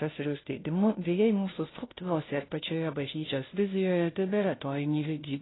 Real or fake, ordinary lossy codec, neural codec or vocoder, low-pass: fake; AAC, 16 kbps; codec, 16 kHz, 0.5 kbps, FunCodec, trained on Chinese and English, 25 frames a second; 7.2 kHz